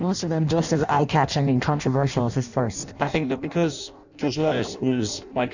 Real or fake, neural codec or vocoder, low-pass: fake; codec, 16 kHz in and 24 kHz out, 0.6 kbps, FireRedTTS-2 codec; 7.2 kHz